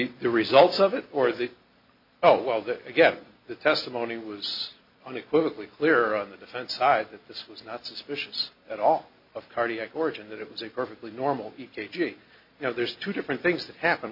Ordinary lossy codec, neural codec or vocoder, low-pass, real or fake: MP3, 48 kbps; none; 5.4 kHz; real